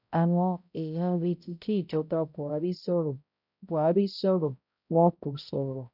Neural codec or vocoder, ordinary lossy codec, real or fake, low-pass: codec, 16 kHz, 0.5 kbps, X-Codec, HuBERT features, trained on balanced general audio; none; fake; 5.4 kHz